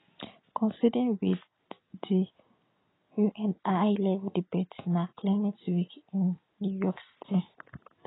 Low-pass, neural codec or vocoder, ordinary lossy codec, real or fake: 7.2 kHz; none; AAC, 16 kbps; real